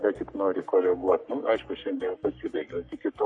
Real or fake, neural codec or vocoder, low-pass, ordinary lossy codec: fake; codec, 44.1 kHz, 3.4 kbps, Pupu-Codec; 10.8 kHz; MP3, 48 kbps